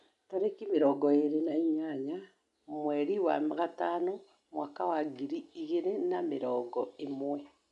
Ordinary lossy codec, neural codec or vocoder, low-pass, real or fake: none; none; 10.8 kHz; real